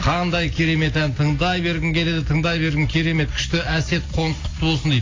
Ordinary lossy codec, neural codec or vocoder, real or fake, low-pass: AAC, 32 kbps; none; real; 7.2 kHz